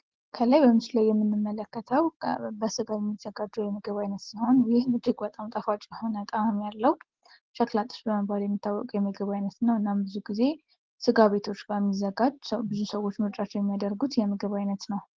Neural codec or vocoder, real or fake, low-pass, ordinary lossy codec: none; real; 7.2 kHz; Opus, 16 kbps